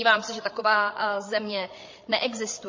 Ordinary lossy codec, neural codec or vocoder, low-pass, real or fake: MP3, 32 kbps; codec, 16 kHz, 8 kbps, FreqCodec, larger model; 7.2 kHz; fake